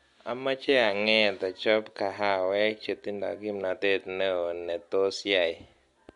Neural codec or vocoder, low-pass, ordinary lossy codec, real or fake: none; 10.8 kHz; MP3, 64 kbps; real